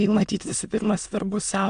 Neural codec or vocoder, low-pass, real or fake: autoencoder, 22.05 kHz, a latent of 192 numbers a frame, VITS, trained on many speakers; 9.9 kHz; fake